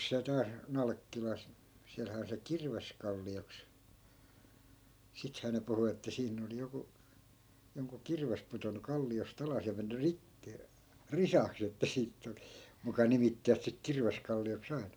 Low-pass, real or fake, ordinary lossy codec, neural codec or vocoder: none; real; none; none